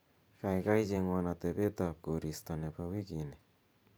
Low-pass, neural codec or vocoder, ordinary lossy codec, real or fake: none; vocoder, 44.1 kHz, 128 mel bands every 512 samples, BigVGAN v2; none; fake